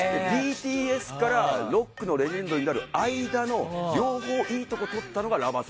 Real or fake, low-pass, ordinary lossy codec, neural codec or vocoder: real; none; none; none